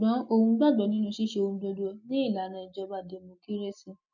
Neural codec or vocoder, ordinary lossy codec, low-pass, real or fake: none; none; 7.2 kHz; real